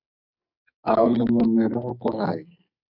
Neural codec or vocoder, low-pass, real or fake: codec, 44.1 kHz, 2.6 kbps, SNAC; 5.4 kHz; fake